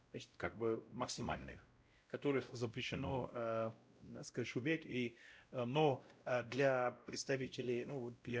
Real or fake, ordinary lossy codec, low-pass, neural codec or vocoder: fake; none; none; codec, 16 kHz, 0.5 kbps, X-Codec, WavLM features, trained on Multilingual LibriSpeech